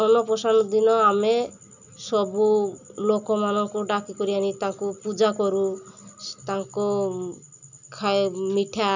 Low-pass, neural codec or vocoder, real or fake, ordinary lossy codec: 7.2 kHz; none; real; none